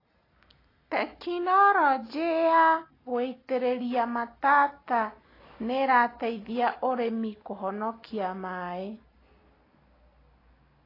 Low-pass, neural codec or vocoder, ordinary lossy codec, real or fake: 5.4 kHz; none; AAC, 24 kbps; real